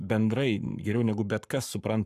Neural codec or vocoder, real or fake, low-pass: codec, 44.1 kHz, 7.8 kbps, Pupu-Codec; fake; 14.4 kHz